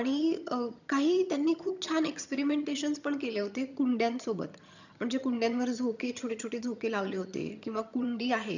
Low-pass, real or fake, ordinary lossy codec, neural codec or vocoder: 7.2 kHz; fake; none; vocoder, 22.05 kHz, 80 mel bands, HiFi-GAN